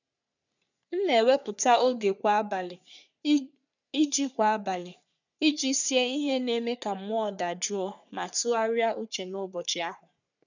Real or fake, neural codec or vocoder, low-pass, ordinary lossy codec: fake; codec, 44.1 kHz, 3.4 kbps, Pupu-Codec; 7.2 kHz; none